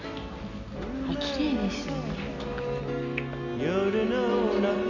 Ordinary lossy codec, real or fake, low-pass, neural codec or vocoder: none; real; 7.2 kHz; none